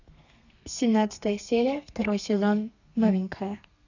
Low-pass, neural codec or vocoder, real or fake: 7.2 kHz; codec, 32 kHz, 1.9 kbps, SNAC; fake